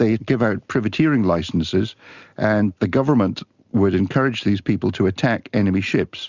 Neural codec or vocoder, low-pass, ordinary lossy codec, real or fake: none; 7.2 kHz; Opus, 64 kbps; real